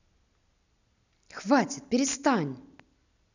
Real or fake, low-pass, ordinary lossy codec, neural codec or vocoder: real; 7.2 kHz; none; none